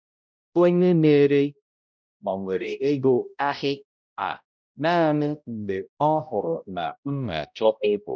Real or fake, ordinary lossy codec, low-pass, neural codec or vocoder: fake; none; none; codec, 16 kHz, 0.5 kbps, X-Codec, HuBERT features, trained on balanced general audio